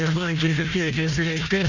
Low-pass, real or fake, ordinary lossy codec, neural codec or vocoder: 7.2 kHz; fake; none; codec, 16 kHz, 1 kbps, FunCodec, trained on Chinese and English, 50 frames a second